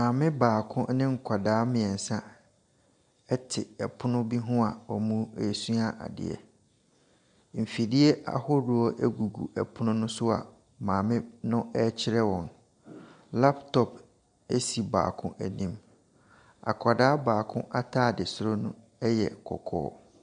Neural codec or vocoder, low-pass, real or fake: none; 9.9 kHz; real